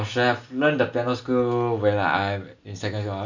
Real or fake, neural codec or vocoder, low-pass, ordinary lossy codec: real; none; 7.2 kHz; none